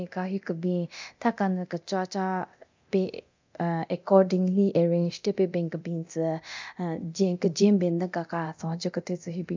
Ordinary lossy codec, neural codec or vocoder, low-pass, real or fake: MP3, 64 kbps; codec, 24 kHz, 0.9 kbps, DualCodec; 7.2 kHz; fake